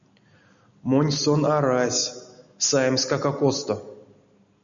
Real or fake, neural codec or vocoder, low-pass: real; none; 7.2 kHz